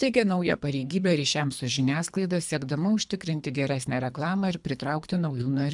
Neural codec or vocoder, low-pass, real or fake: codec, 24 kHz, 3 kbps, HILCodec; 10.8 kHz; fake